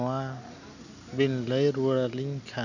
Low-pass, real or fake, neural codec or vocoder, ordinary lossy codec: 7.2 kHz; real; none; none